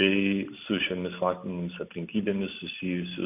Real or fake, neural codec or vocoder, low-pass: real; none; 3.6 kHz